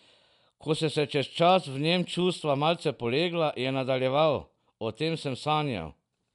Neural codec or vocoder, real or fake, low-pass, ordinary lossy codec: none; real; 10.8 kHz; none